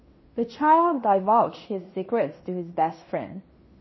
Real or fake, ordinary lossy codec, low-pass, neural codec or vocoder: fake; MP3, 24 kbps; 7.2 kHz; codec, 16 kHz in and 24 kHz out, 0.9 kbps, LongCat-Audio-Codec, fine tuned four codebook decoder